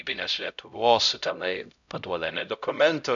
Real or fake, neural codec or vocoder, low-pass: fake; codec, 16 kHz, 0.5 kbps, X-Codec, HuBERT features, trained on LibriSpeech; 7.2 kHz